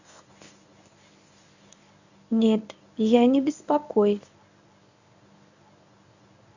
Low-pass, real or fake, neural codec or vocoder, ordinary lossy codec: 7.2 kHz; fake; codec, 24 kHz, 0.9 kbps, WavTokenizer, medium speech release version 1; none